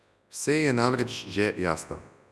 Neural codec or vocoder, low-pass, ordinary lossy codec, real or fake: codec, 24 kHz, 0.9 kbps, WavTokenizer, large speech release; none; none; fake